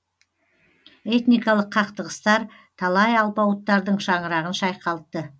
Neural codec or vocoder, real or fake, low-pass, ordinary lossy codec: none; real; none; none